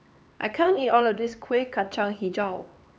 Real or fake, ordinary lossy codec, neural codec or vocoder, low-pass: fake; none; codec, 16 kHz, 4 kbps, X-Codec, HuBERT features, trained on LibriSpeech; none